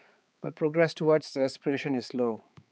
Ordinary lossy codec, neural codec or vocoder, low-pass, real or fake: none; codec, 16 kHz, 4 kbps, X-Codec, HuBERT features, trained on balanced general audio; none; fake